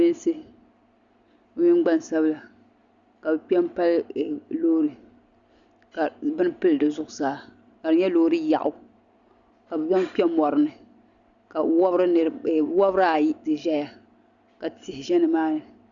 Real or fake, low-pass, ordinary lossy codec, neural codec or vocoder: real; 7.2 kHz; Opus, 64 kbps; none